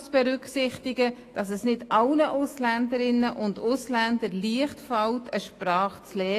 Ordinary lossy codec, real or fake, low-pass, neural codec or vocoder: AAC, 48 kbps; fake; 14.4 kHz; autoencoder, 48 kHz, 128 numbers a frame, DAC-VAE, trained on Japanese speech